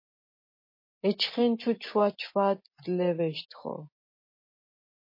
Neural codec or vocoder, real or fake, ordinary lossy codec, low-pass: none; real; MP3, 24 kbps; 5.4 kHz